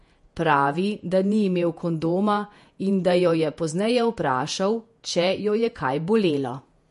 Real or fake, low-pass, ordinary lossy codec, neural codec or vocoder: fake; 14.4 kHz; MP3, 48 kbps; vocoder, 48 kHz, 128 mel bands, Vocos